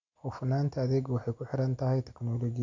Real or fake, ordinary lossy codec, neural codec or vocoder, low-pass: real; MP3, 48 kbps; none; 7.2 kHz